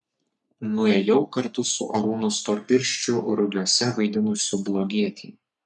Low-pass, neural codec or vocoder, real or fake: 10.8 kHz; codec, 44.1 kHz, 3.4 kbps, Pupu-Codec; fake